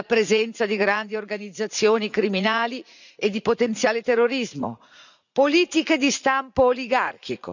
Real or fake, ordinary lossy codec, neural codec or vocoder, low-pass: fake; none; vocoder, 44.1 kHz, 80 mel bands, Vocos; 7.2 kHz